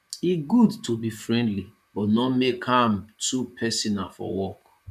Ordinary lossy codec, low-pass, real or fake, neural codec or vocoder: none; 14.4 kHz; fake; vocoder, 44.1 kHz, 128 mel bands, Pupu-Vocoder